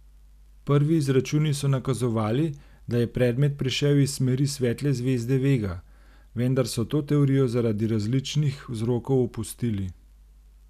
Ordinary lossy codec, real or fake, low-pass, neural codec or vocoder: none; real; 14.4 kHz; none